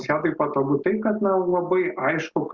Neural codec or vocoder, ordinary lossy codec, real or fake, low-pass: none; Opus, 64 kbps; real; 7.2 kHz